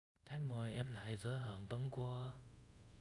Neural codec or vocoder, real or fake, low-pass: codec, 24 kHz, 0.5 kbps, DualCodec; fake; 10.8 kHz